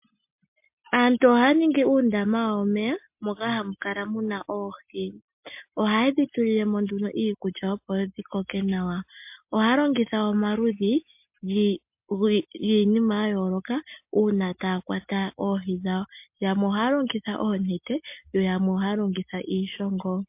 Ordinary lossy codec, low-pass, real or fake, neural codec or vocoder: MP3, 32 kbps; 3.6 kHz; real; none